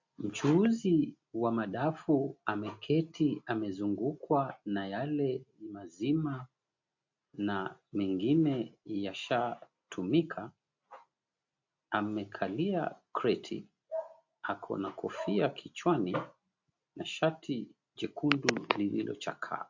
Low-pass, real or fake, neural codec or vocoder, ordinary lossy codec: 7.2 kHz; real; none; MP3, 48 kbps